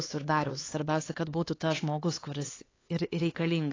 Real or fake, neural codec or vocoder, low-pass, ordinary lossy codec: fake; codec, 16 kHz, 4 kbps, X-Codec, HuBERT features, trained on LibriSpeech; 7.2 kHz; AAC, 32 kbps